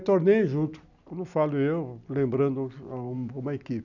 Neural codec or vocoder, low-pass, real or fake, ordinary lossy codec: none; 7.2 kHz; real; none